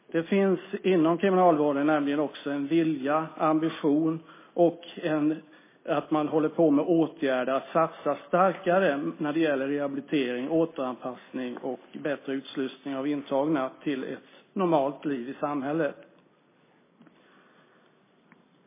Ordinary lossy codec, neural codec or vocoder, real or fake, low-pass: MP3, 16 kbps; none; real; 3.6 kHz